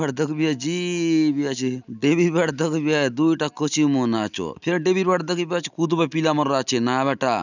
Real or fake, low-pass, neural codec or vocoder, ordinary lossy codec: real; 7.2 kHz; none; none